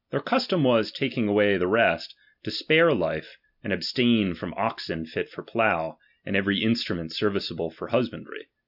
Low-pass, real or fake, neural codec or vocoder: 5.4 kHz; real; none